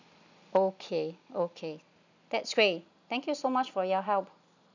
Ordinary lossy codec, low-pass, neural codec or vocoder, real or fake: none; 7.2 kHz; none; real